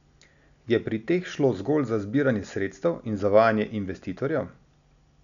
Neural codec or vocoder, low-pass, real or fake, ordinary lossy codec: none; 7.2 kHz; real; none